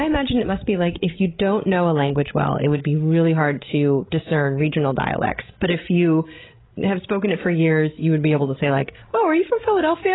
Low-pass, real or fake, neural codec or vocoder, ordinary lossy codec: 7.2 kHz; fake; codec, 16 kHz, 16 kbps, FreqCodec, larger model; AAC, 16 kbps